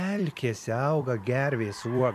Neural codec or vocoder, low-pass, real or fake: vocoder, 44.1 kHz, 128 mel bands every 256 samples, BigVGAN v2; 14.4 kHz; fake